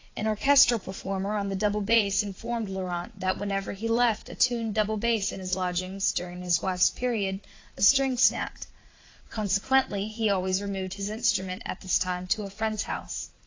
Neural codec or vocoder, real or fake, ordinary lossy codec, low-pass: vocoder, 44.1 kHz, 80 mel bands, Vocos; fake; AAC, 32 kbps; 7.2 kHz